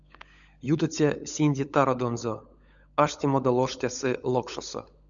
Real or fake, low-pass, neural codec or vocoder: fake; 7.2 kHz; codec, 16 kHz, 16 kbps, FunCodec, trained on LibriTTS, 50 frames a second